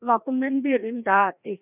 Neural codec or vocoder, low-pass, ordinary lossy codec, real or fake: codec, 16 kHz, 1 kbps, FreqCodec, larger model; 3.6 kHz; none; fake